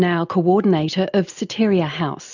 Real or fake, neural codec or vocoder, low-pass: real; none; 7.2 kHz